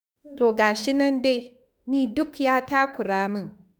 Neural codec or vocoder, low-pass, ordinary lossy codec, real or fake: autoencoder, 48 kHz, 32 numbers a frame, DAC-VAE, trained on Japanese speech; none; none; fake